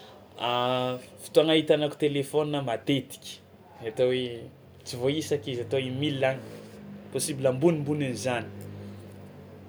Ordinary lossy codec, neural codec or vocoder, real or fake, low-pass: none; none; real; none